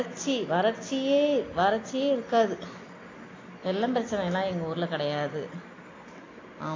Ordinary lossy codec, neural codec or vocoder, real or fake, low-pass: AAC, 32 kbps; none; real; 7.2 kHz